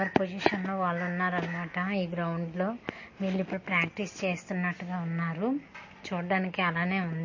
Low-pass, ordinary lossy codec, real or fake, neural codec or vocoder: 7.2 kHz; MP3, 32 kbps; real; none